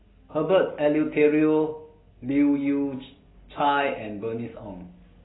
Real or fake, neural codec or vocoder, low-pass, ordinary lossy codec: real; none; 7.2 kHz; AAC, 16 kbps